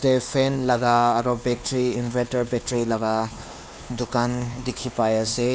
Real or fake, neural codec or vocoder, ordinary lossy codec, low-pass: fake; codec, 16 kHz, 2 kbps, X-Codec, WavLM features, trained on Multilingual LibriSpeech; none; none